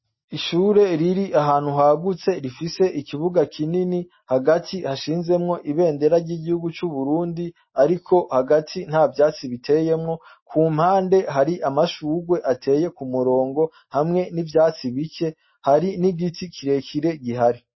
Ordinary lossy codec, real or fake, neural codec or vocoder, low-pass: MP3, 24 kbps; real; none; 7.2 kHz